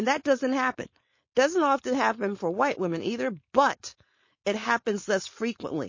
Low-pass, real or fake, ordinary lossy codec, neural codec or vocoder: 7.2 kHz; fake; MP3, 32 kbps; codec, 16 kHz, 4.8 kbps, FACodec